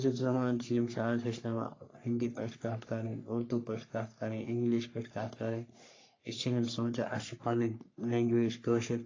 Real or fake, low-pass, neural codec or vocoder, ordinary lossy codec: fake; 7.2 kHz; codec, 44.1 kHz, 3.4 kbps, Pupu-Codec; AAC, 32 kbps